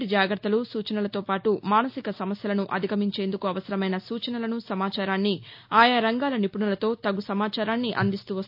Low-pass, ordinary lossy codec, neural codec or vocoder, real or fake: 5.4 kHz; AAC, 48 kbps; none; real